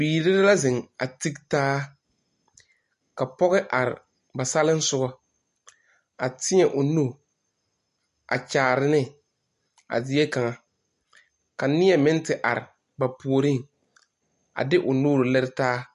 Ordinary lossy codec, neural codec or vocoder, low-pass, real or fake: MP3, 48 kbps; none; 14.4 kHz; real